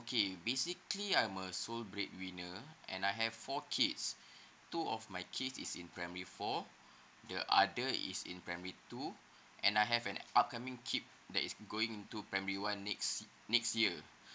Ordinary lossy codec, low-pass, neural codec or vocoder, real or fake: none; none; none; real